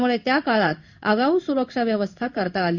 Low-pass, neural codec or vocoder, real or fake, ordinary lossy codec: 7.2 kHz; codec, 16 kHz in and 24 kHz out, 1 kbps, XY-Tokenizer; fake; Opus, 64 kbps